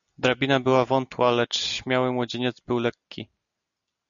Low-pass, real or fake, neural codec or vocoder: 7.2 kHz; real; none